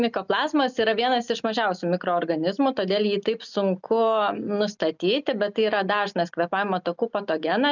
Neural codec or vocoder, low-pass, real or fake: none; 7.2 kHz; real